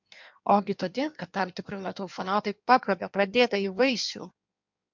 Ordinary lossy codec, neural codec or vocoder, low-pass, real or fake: MP3, 64 kbps; codec, 16 kHz in and 24 kHz out, 1.1 kbps, FireRedTTS-2 codec; 7.2 kHz; fake